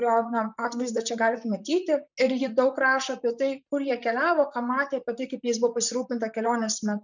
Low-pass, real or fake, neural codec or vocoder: 7.2 kHz; fake; vocoder, 44.1 kHz, 128 mel bands, Pupu-Vocoder